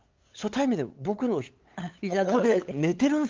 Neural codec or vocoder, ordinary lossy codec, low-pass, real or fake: codec, 16 kHz, 8 kbps, FunCodec, trained on LibriTTS, 25 frames a second; Opus, 32 kbps; 7.2 kHz; fake